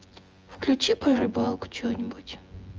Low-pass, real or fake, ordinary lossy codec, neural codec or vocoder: 7.2 kHz; fake; Opus, 24 kbps; vocoder, 24 kHz, 100 mel bands, Vocos